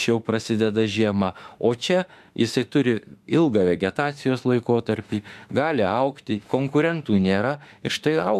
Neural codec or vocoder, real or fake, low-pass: autoencoder, 48 kHz, 32 numbers a frame, DAC-VAE, trained on Japanese speech; fake; 14.4 kHz